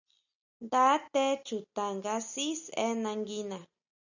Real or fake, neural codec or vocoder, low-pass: real; none; 7.2 kHz